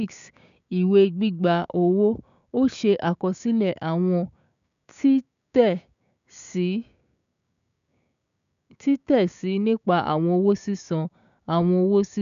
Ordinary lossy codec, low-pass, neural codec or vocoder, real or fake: none; 7.2 kHz; codec, 16 kHz, 6 kbps, DAC; fake